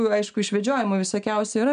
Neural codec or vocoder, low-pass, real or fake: none; 9.9 kHz; real